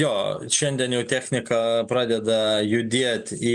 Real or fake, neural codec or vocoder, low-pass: real; none; 10.8 kHz